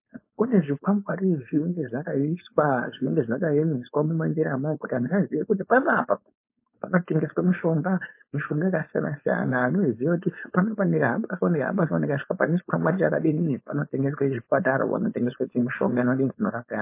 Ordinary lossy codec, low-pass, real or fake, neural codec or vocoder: MP3, 24 kbps; 3.6 kHz; fake; codec, 16 kHz, 4.8 kbps, FACodec